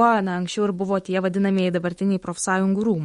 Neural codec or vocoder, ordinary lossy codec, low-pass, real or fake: autoencoder, 48 kHz, 128 numbers a frame, DAC-VAE, trained on Japanese speech; MP3, 48 kbps; 19.8 kHz; fake